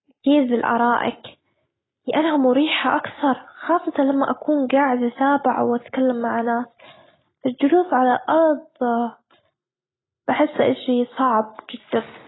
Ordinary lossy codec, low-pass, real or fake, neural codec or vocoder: AAC, 16 kbps; 7.2 kHz; real; none